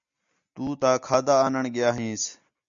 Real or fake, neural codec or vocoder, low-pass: real; none; 7.2 kHz